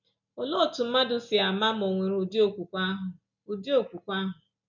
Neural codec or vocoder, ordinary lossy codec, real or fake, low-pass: none; none; real; 7.2 kHz